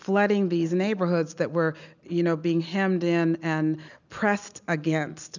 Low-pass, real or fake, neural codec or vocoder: 7.2 kHz; real; none